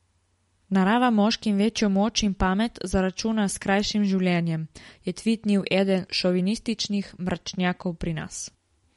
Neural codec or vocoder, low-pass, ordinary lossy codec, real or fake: none; 19.8 kHz; MP3, 48 kbps; real